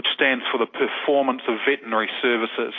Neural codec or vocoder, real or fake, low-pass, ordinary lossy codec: none; real; 7.2 kHz; MP3, 32 kbps